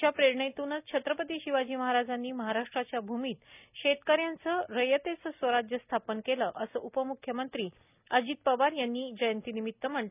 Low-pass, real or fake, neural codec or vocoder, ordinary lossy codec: 3.6 kHz; real; none; none